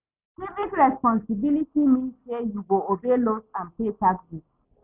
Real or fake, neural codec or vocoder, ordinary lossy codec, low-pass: real; none; none; 3.6 kHz